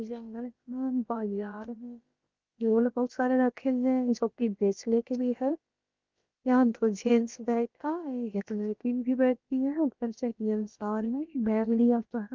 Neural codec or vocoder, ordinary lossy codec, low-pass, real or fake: codec, 16 kHz, about 1 kbps, DyCAST, with the encoder's durations; Opus, 24 kbps; 7.2 kHz; fake